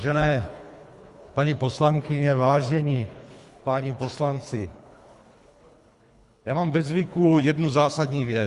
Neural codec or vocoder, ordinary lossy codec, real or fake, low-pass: codec, 24 kHz, 3 kbps, HILCodec; Opus, 64 kbps; fake; 10.8 kHz